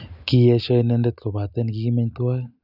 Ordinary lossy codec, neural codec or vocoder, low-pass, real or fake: none; none; 5.4 kHz; real